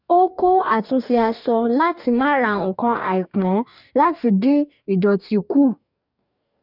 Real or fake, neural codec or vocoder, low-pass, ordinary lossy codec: fake; codec, 44.1 kHz, 2.6 kbps, DAC; 5.4 kHz; none